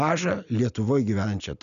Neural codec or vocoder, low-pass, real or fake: none; 7.2 kHz; real